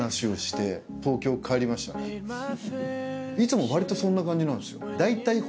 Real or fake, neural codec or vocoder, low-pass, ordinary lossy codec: real; none; none; none